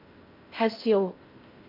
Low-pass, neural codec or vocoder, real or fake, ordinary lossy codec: 5.4 kHz; codec, 16 kHz in and 24 kHz out, 0.8 kbps, FocalCodec, streaming, 65536 codes; fake; MP3, 32 kbps